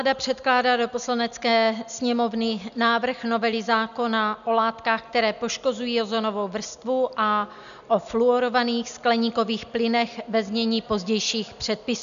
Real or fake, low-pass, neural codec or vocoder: real; 7.2 kHz; none